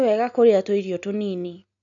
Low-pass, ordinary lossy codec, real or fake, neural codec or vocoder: 7.2 kHz; none; real; none